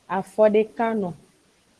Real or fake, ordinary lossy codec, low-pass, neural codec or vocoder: real; Opus, 16 kbps; 10.8 kHz; none